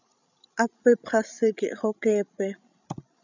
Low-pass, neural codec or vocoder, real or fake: 7.2 kHz; codec, 16 kHz, 16 kbps, FreqCodec, larger model; fake